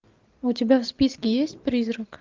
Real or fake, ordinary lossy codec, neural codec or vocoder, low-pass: real; Opus, 24 kbps; none; 7.2 kHz